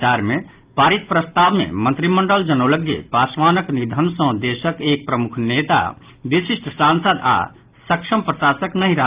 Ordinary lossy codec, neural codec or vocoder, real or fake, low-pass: Opus, 32 kbps; none; real; 3.6 kHz